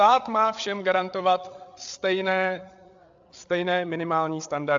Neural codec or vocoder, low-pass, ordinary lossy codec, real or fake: codec, 16 kHz, 8 kbps, FreqCodec, larger model; 7.2 kHz; AAC, 64 kbps; fake